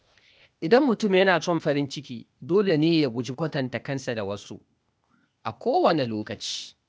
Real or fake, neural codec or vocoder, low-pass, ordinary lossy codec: fake; codec, 16 kHz, 0.8 kbps, ZipCodec; none; none